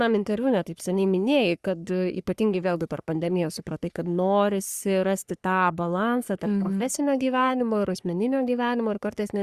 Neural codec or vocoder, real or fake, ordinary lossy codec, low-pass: codec, 44.1 kHz, 3.4 kbps, Pupu-Codec; fake; Opus, 64 kbps; 14.4 kHz